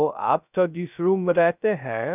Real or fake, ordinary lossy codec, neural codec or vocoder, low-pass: fake; none; codec, 16 kHz, 0.2 kbps, FocalCodec; 3.6 kHz